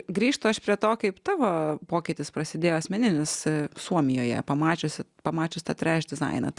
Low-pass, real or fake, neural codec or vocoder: 10.8 kHz; real; none